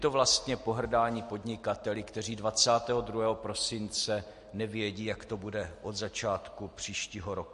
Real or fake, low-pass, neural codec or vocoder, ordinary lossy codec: real; 14.4 kHz; none; MP3, 48 kbps